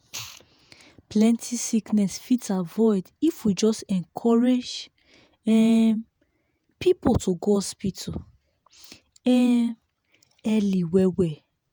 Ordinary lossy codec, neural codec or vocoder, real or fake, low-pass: none; vocoder, 48 kHz, 128 mel bands, Vocos; fake; none